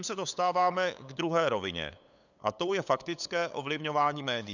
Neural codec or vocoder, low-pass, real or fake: codec, 16 kHz, 8 kbps, FunCodec, trained on LibriTTS, 25 frames a second; 7.2 kHz; fake